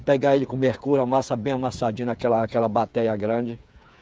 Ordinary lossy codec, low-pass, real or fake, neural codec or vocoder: none; none; fake; codec, 16 kHz, 8 kbps, FreqCodec, smaller model